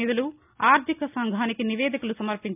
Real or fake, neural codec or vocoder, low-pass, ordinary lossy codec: real; none; 3.6 kHz; none